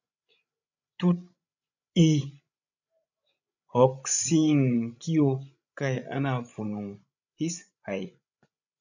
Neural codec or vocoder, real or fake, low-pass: codec, 16 kHz, 16 kbps, FreqCodec, larger model; fake; 7.2 kHz